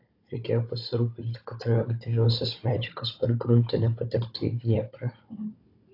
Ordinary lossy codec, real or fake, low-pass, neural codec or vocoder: AAC, 32 kbps; fake; 5.4 kHz; codec, 16 kHz, 16 kbps, FunCodec, trained on Chinese and English, 50 frames a second